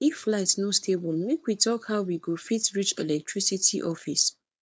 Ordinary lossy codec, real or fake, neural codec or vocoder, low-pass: none; fake; codec, 16 kHz, 4.8 kbps, FACodec; none